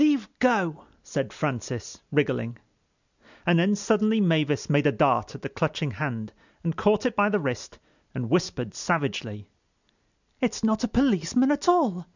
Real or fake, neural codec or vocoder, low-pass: real; none; 7.2 kHz